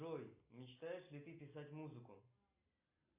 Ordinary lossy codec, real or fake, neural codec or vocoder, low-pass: AAC, 32 kbps; real; none; 3.6 kHz